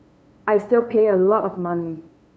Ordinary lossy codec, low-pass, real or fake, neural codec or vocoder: none; none; fake; codec, 16 kHz, 2 kbps, FunCodec, trained on LibriTTS, 25 frames a second